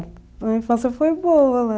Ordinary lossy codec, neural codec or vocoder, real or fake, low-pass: none; none; real; none